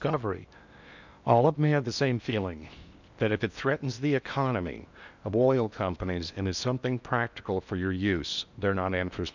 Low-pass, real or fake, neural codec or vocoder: 7.2 kHz; fake; codec, 16 kHz in and 24 kHz out, 0.8 kbps, FocalCodec, streaming, 65536 codes